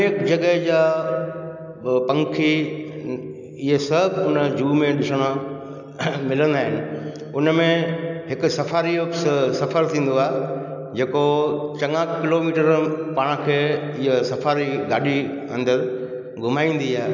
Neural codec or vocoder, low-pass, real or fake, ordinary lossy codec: none; 7.2 kHz; real; none